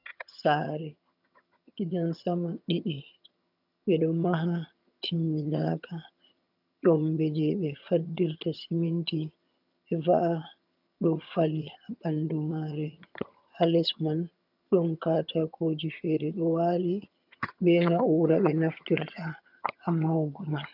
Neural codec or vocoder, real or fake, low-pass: vocoder, 22.05 kHz, 80 mel bands, HiFi-GAN; fake; 5.4 kHz